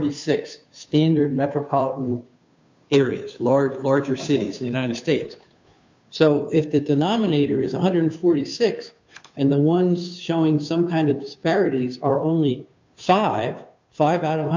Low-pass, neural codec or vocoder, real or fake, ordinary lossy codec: 7.2 kHz; codec, 16 kHz, 2 kbps, FunCodec, trained on Chinese and English, 25 frames a second; fake; MP3, 64 kbps